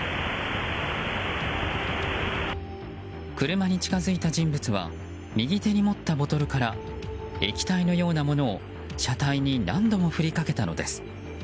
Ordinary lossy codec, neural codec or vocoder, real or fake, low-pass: none; none; real; none